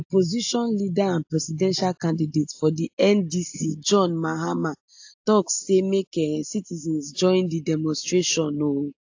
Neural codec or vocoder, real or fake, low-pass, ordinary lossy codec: none; real; 7.2 kHz; AAC, 48 kbps